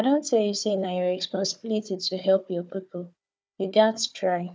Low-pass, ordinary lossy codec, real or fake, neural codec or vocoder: none; none; fake; codec, 16 kHz, 4 kbps, FunCodec, trained on Chinese and English, 50 frames a second